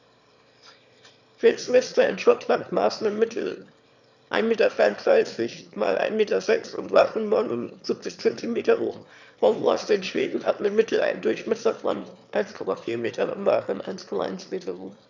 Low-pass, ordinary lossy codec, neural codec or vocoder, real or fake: 7.2 kHz; none; autoencoder, 22.05 kHz, a latent of 192 numbers a frame, VITS, trained on one speaker; fake